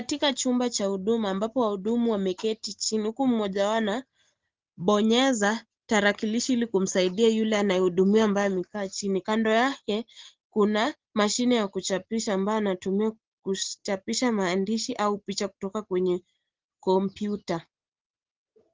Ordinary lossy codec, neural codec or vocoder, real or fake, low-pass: Opus, 16 kbps; none; real; 7.2 kHz